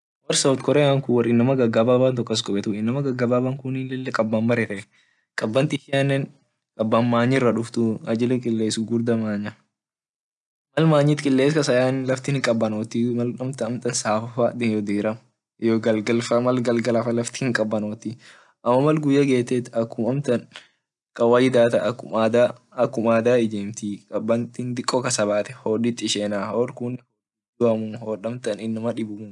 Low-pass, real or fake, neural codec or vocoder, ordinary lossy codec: 10.8 kHz; real; none; MP3, 96 kbps